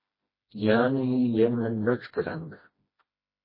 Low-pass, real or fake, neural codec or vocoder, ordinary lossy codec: 5.4 kHz; fake; codec, 16 kHz, 1 kbps, FreqCodec, smaller model; MP3, 24 kbps